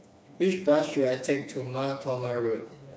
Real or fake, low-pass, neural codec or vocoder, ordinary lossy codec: fake; none; codec, 16 kHz, 2 kbps, FreqCodec, smaller model; none